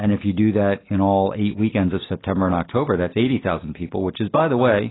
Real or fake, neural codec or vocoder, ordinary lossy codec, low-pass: real; none; AAC, 16 kbps; 7.2 kHz